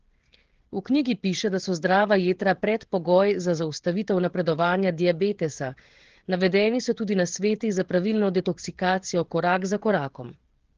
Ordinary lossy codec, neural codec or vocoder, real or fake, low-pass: Opus, 16 kbps; codec, 16 kHz, 16 kbps, FreqCodec, smaller model; fake; 7.2 kHz